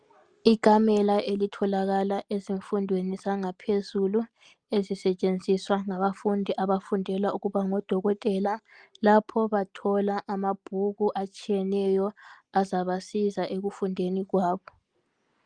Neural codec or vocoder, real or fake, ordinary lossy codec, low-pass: none; real; Opus, 32 kbps; 9.9 kHz